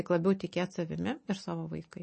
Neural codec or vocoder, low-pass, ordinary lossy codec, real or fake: none; 10.8 kHz; MP3, 32 kbps; real